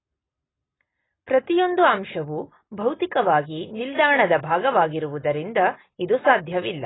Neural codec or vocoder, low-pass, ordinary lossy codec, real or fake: none; 7.2 kHz; AAC, 16 kbps; real